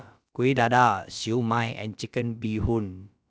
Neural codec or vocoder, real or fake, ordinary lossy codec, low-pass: codec, 16 kHz, about 1 kbps, DyCAST, with the encoder's durations; fake; none; none